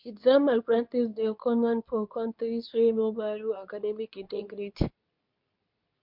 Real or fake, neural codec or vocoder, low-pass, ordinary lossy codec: fake; codec, 24 kHz, 0.9 kbps, WavTokenizer, medium speech release version 2; 5.4 kHz; MP3, 48 kbps